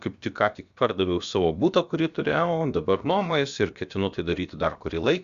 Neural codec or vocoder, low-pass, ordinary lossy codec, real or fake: codec, 16 kHz, about 1 kbps, DyCAST, with the encoder's durations; 7.2 kHz; Opus, 64 kbps; fake